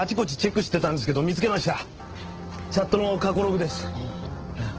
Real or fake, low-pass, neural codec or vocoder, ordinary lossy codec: real; 7.2 kHz; none; Opus, 16 kbps